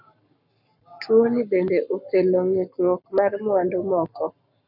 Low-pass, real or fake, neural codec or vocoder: 5.4 kHz; fake; codec, 44.1 kHz, 7.8 kbps, Pupu-Codec